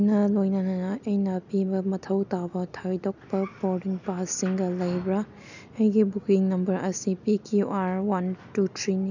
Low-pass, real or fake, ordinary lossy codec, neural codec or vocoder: 7.2 kHz; real; none; none